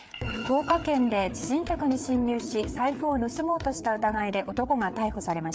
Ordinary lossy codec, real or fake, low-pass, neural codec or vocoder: none; fake; none; codec, 16 kHz, 4 kbps, FreqCodec, larger model